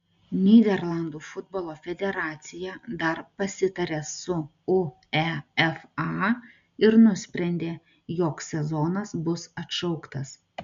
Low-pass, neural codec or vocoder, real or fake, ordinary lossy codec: 7.2 kHz; none; real; MP3, 64 kbps